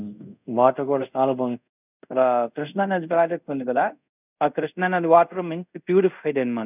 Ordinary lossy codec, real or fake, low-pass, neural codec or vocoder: none; fake; 3.6 kHz; codec, 24 kHz, 0.5 kbps, DualCodec